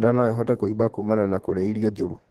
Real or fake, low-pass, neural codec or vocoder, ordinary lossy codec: fake; 14.4 kHz; codec, 32 kHz, 1.9 kbps, SNAC; Opus, 16 kbps